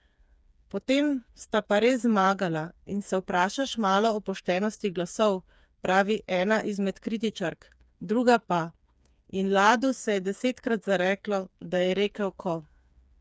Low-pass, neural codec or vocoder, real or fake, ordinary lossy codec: none; codec, 16 kHz, 4 kbps, FreqCodec, smaller model; fake; none